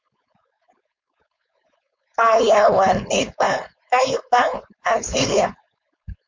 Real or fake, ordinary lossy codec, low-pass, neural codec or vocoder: fake; MP3, 48 kbps; 7.2 kHz; codec, 16 kHz, 4.8 kbps, FACodec